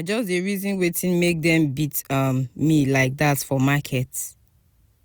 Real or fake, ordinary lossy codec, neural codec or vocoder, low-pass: real; none; none; none